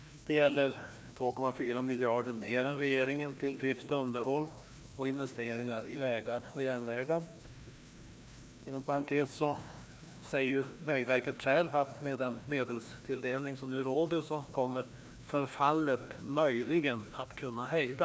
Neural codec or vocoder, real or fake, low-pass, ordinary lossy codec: codec, 16 kHz, 1 kbps, FreqCodec, larger model; fake; none; none